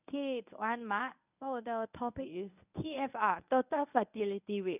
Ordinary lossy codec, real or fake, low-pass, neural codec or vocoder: none; fake; 3.6 kHz; codec, 24 kHz, 0.9 kbps, WavTokenizer, medium speech release version 1